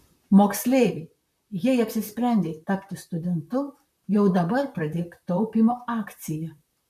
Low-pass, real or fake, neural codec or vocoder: 14.4 kHz; fake; vocoder, 44.1 kHz, 128 mel bands, Pupu-Vocoder